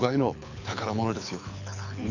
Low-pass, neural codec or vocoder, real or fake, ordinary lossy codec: 7.2 kHz; codec, 24 kHz, 6 kbps, HILCodec; fake; none